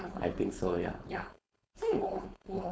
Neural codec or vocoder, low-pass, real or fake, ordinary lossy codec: codec, 16 kHz, 4.8 kbps, FACodec; none; fake; none